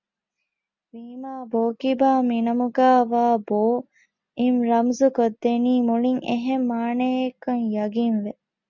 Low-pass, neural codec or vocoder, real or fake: 7.2 kHz; none; real